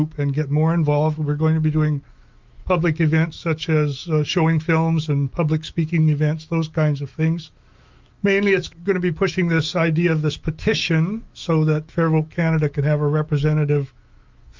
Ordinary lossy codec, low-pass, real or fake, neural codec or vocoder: Opus, 24 kbps; 7.2 kHz; fake; autoencoder, 48 kHz, 128 numbers a frame, DAC-VAE, trained on Japanese speech